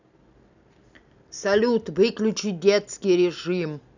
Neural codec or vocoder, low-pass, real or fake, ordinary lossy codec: none; 7.2 kHz; real; none